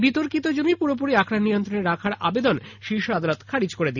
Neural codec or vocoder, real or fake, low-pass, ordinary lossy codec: none; real; 7.2 kHz; none